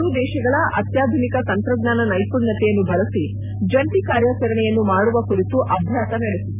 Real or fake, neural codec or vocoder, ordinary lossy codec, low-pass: real; none; none; 3.6 kHz